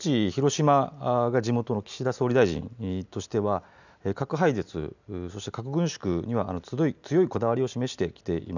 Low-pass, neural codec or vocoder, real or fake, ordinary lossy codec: 7.2 kHz; none; real; none